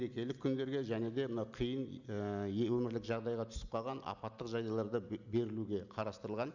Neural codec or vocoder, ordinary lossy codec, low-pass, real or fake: none; none; 7.2 kHz; real